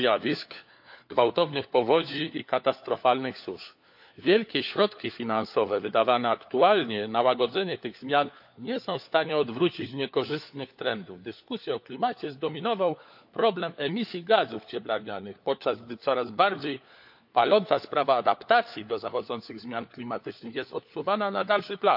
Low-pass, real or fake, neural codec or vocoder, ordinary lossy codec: 5.4 kHz; fake; codec, 16 kHz, 4 kbps, FunCodec, trained on LibriTTS, 50 frames a second; AAC, 48 kbps